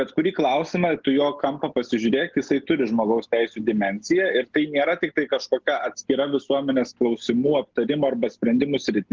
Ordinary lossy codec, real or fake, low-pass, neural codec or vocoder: Opus, 16 kbps; real; 7.2 kHz; none